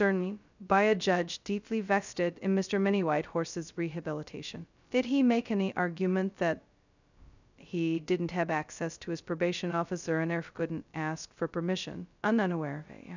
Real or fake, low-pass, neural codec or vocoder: fake; 7.2 kHz; codec, 16 kHz, 0.2 kbps, FocalCodec